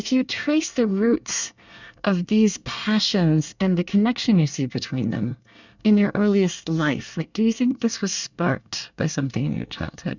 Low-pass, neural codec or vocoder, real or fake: 7.2 kHz; codec, 24 kHz, 1 kbps, SNAC; fake